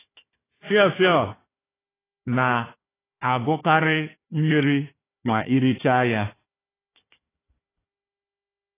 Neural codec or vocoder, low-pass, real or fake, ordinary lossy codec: codec, 16 kHz, 1 kbps, FunCodec, trained on Chinese and English, 50 frames a second; 3.6 kHz; fake; AAC, 16 kbps